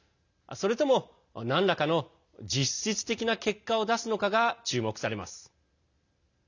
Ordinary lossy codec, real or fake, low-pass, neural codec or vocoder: none; real; 7.2 kHz; none